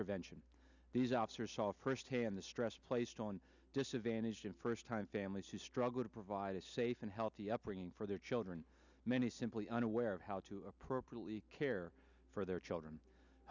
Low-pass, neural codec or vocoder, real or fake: 7.2 kHz; none; real